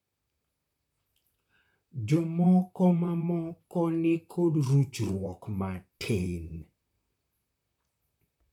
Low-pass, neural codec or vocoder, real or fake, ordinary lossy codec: 19.8 kHz; vocoder, 44.1 kHz, 128 mel bands, Pupu-Vocoder; fake; none